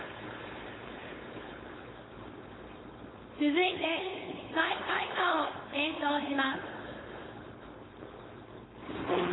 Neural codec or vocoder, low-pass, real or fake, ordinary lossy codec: codec, 16 kHz, 4.8 kbps, FACodec; 7.2 kHz; fake; AAC, 16 kbps